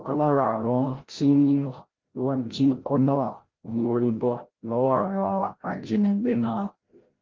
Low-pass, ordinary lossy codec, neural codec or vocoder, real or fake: 7.2 kHz; Opus, 16 kbps; codec, 16 kHz, 0.5 kbps, FreqCodec, larger model; fake